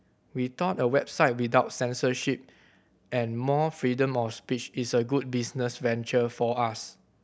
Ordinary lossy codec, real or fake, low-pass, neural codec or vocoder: none; real; none; none